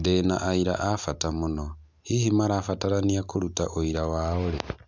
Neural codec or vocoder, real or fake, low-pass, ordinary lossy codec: none; real; none; none